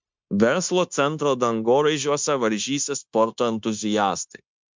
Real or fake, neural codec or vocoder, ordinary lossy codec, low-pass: fake; codec, 16 kHz, 0.9 kbps, LongCat-Audio-Codec; MP3, 64 kbps; 7.2 kHz